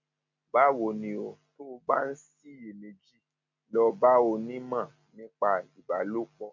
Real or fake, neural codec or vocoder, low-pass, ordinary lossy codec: real; none; 7.2 kHz; MP3, 64 kbps